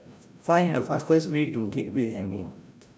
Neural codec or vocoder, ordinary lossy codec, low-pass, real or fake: codec, 16 kHz, 0.5 kbps, FreqCodec, larger model; none; none; fake